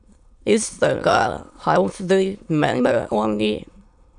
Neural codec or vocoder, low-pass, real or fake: autoencoder, 22.05 kHz, a latent of 192 numbers a frame, VITS, trained on many speakers; 9.9 kHz; fake